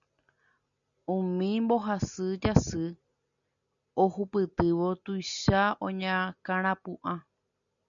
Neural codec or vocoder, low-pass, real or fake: none; 7.2 kHz; real